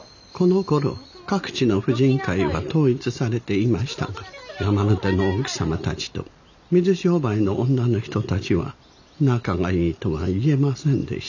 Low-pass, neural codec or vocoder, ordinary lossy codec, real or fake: 7.2 kHz; none; none; real